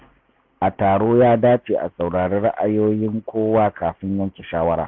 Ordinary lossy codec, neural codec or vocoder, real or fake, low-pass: Opus, 64 kbps; none; real; 7.2 kHz